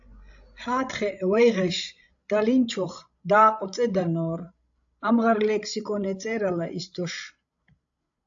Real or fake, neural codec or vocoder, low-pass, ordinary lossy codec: fake; codec, 16 kHz, 16 kbps, FreqCodec, larger model; 7.2 kHz; AAC, 64 kbps